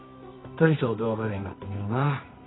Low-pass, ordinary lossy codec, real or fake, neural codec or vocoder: 7.2 kHz; AAC, 16 kbps; fake; codec, 24 kHz, 0.9 kbps, WavTokenizer, medium music audio release